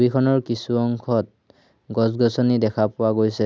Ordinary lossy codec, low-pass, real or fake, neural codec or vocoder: none; none; real; none